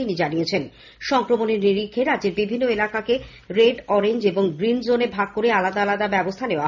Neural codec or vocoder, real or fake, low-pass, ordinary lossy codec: none; real; 7.2 kHz; none